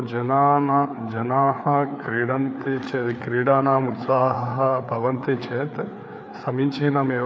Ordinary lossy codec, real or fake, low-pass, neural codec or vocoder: none; fake; none; codec, 16 kHz, 4 kbps, FreqCodec, larger model